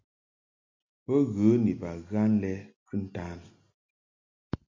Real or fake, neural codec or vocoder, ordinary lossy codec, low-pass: real; none; MP3, 48 kbps; 7.2 kHz